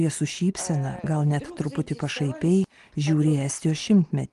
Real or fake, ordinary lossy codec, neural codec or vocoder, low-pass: real; Opus, 24 kbps; none; 10.8 kHz